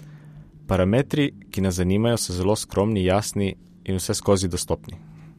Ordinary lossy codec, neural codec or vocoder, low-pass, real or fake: MP3, 64 kbps; none; 14.4 kHz; real